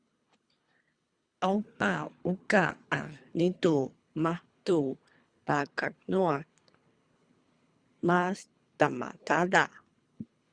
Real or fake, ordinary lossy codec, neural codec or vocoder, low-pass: fake; Opus, 64 kbps; codec, 24 kHz, 3 kbps, HILCodec; 9.9 kHz